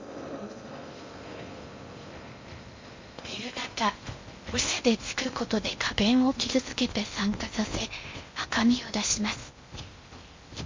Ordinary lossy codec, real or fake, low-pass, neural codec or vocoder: MP3, 48 kbps; fake; 7.2 kHz; codec, 16 kHz in and 24 kHz out, 0.6 kbps, FocalCodec, streaming, 4096 codes